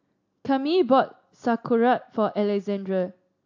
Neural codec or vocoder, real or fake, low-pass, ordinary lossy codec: none; real; 7.2 kHz; AAC, 48 kbps